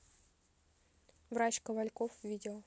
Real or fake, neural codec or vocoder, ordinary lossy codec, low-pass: real; none; none; none